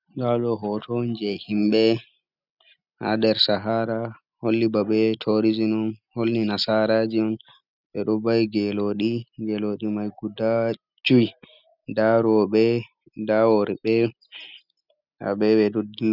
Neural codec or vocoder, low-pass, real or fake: none; 5.4 kHz; real